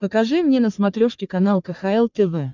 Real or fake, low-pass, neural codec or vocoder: fake; 7.2 kHz; codec, 44.1 kHz, 3.4 kbps, Pupu-Codec